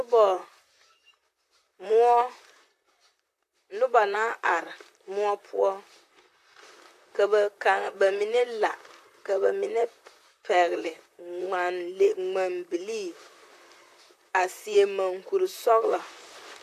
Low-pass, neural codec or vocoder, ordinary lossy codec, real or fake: 14.4 kHz; vocoder, 44.1 kHz, 128 mel bands, Pupu-Vocoder; AAC, 64 kbps; fake